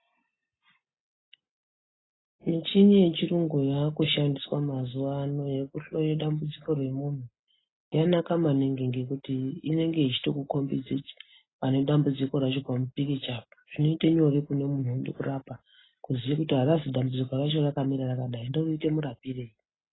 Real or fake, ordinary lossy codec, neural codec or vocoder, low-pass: real; AAC, 16 kbps; none; 7.2 kHz